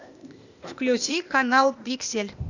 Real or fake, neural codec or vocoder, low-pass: fake; codec, 16 kHz, 0.8 kbps, ZipCodec; 7.2 kHz